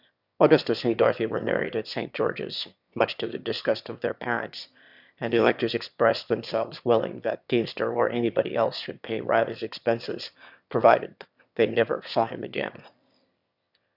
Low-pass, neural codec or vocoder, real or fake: 5.4 kHz; autoencoder, 22.05 kHz, a latent of 192 numbers a frame, VITS, trained on one speaker; fake